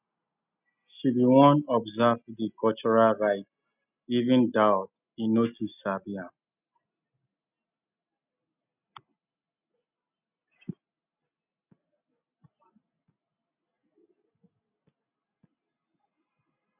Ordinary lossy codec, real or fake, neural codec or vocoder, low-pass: none; real; none; 3.6 kHz